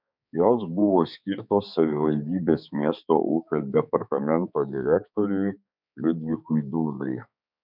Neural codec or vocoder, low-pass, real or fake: codec, 16 kHz, 4 kbps, X-Codec, HuBERT features, trained on balanced general audio; 5.4 kHz; fake